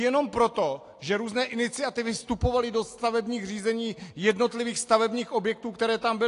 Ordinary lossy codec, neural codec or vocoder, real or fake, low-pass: AAC, 48 kbps; none; real; 10.8 kHz